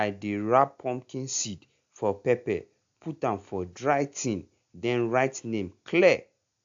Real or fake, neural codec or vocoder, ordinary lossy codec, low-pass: real; none; AAC, 64 kbps; 7.2 kHz